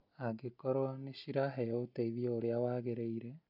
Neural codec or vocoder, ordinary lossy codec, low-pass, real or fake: none; none; 5.4 kHz; real